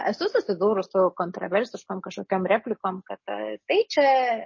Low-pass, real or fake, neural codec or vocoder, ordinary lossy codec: 7.2 kHz; real; none; MP3, 32 kbps